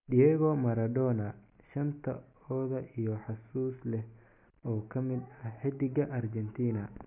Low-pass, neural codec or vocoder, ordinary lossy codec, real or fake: 3.6 kHz; none; MP3, 32 kbps; real